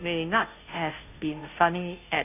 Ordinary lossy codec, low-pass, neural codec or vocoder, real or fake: none; 3.6 kHz; codec, 16 kHz, 0.5 kbps, FunCodec, trained on Chinese and English, 25 frames a second; fake